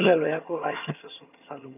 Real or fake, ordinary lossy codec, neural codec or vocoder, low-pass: fake; none; vocoder, 22.05 kHz, 80 mel bands, HiFi-GAN; 3.6 kHz